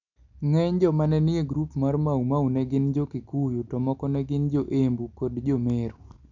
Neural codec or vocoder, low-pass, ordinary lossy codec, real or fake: none; 7.2 kHz; none; real